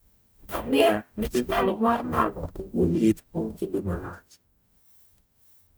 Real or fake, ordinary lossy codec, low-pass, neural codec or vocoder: fake; none; none; codec, 44.1 kHz, 0.9 kbps, DAC